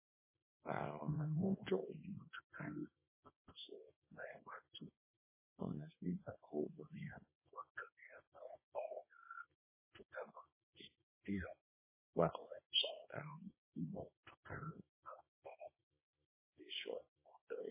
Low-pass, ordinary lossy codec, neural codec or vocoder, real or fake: 3.6 kHz; MP3, 16 kbps; codec, 24 kHz, 0.9 kbps, WavTokenizer, small release; fake